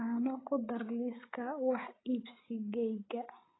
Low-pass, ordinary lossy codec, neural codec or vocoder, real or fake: 7.2 kHz; AAC, 16 kbps; codec, 16 kHz, 8 kbps, FreqCodec, larger model; fake